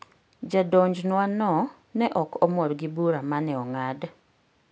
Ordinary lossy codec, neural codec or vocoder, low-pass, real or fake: none; none; none; real